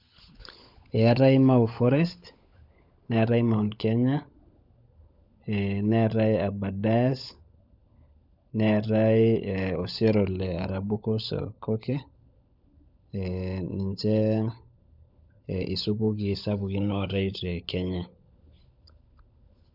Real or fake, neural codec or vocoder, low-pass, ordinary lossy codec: fake; codec, 16 kHz, 16 kbps, FunCodec, trained on LibriTTS, 50 frames a second; 5.4 kHz; none